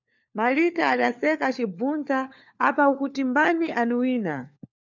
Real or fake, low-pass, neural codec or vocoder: fake; 7.2 kHz; codec, 16 kHz, 4 kbps, FunCodec, trained on LibriTTS, 50 frames a second